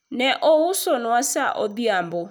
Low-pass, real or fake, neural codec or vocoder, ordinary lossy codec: none; real; none; none